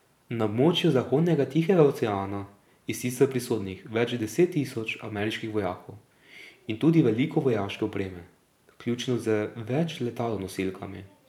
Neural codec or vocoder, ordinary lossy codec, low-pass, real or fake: vocoder, 44.1 kHz, 128 mel bands every 512 samples, BigVGAN v2; none; 19.8 kHz; fake